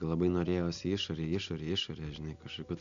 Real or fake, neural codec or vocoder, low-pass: real; none; 7.2 kHz